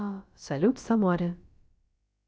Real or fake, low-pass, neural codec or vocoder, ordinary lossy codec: fake; none; codec, 16 kHz, about 1 kbps, DyCAST, with the encoder's durations; none